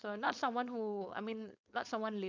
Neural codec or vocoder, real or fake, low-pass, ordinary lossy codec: codec, 16 kHz, 4.8 kbps, FACodec; fake; 7.2 kHz; none